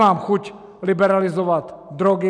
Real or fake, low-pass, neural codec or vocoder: real; 9.9 kHz; none